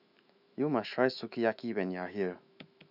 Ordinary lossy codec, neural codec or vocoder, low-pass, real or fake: none; autoencoder, 48 kHz, 128 numbers a frame, DAC-VAE, trained on Japanese speech; 5.4 kHz; fake